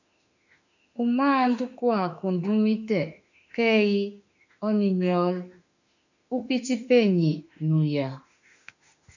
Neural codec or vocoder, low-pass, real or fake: autoencoder, 48 kHz, 32 numbers a frame, DAC-VAE, trained on Japanese speech; 7.2 kHz; fake